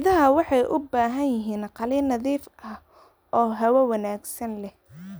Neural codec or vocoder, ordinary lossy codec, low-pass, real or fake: none; none; none; real